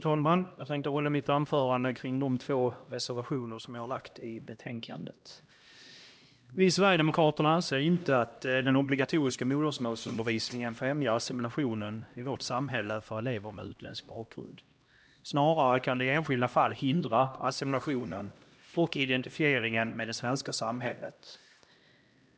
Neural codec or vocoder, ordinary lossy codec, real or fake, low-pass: codec, 16 kHz, 1 kbps, X-Codec, HuBERT features, trained on LibriSpeech; none; fake; none